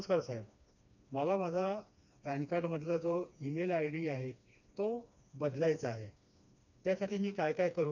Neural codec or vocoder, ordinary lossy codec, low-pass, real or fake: codec, 16 kHz, 2 kbps, FreqCodec, smaller model; none; 7.2 kHz; fake